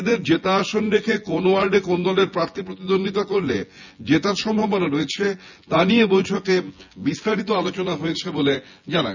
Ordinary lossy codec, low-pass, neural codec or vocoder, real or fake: none; 7.2 kHz; vocoder, 24 kHz, 100 mel bands, Vocos; fake